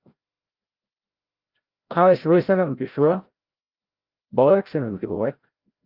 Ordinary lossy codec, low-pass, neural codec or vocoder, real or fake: Opus, 32 kbps; 5.4 kHz; codec, 16 kHz, 0.5 kbps, FreqCodec, larger model; fake